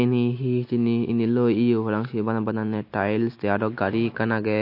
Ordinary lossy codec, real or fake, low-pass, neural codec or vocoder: MP3, 32 kbps; real; 5.4 kHz; none